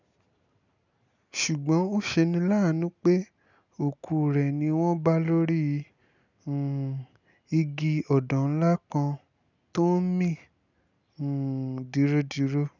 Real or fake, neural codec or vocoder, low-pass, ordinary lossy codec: real; none; 7.2 kHz; none